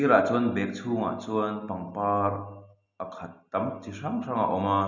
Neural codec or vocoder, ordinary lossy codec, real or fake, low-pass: none; none; real; 7.2 kHz